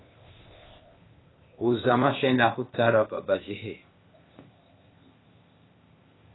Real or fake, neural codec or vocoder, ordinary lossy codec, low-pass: fake; codec, 16 kHz, 0.8 kbps, ZipCodec; AAC, 16 kbps; 7.2 kHz